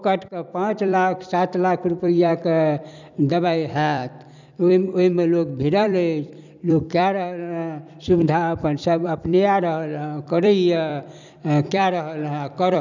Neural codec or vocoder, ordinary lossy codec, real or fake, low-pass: vocoder, 44.1 kHz, 128 mel bands every 256 samples, BigVGAN v2; none; fake; 7.2 kHz